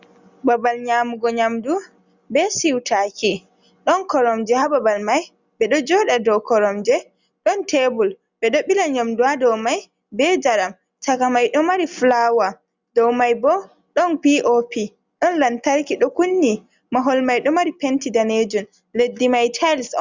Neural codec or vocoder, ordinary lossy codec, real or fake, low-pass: none; Opus, 64 kbps; real; 7.2 kHz